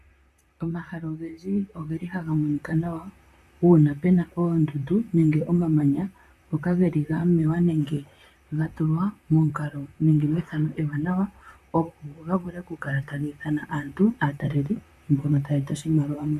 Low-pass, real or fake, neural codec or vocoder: 14.4 kHz; fake; vocoder, 44.1 kHz, 128 mel bands, Pupu-Vocoder